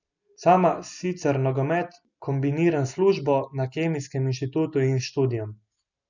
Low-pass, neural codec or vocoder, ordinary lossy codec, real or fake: 7.2 kHz; none; none; real